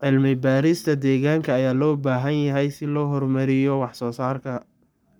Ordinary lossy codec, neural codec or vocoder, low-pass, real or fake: none; codec, 44.1 kHz, 7.8 kbps, Pupu-Codec; none; fake